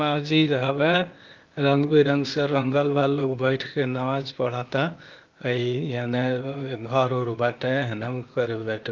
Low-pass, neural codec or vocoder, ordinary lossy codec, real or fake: 7.2 kHz; codec, 16 kHz, 0.8 kbps, ZipCodec; Opus, 24 kbps; fake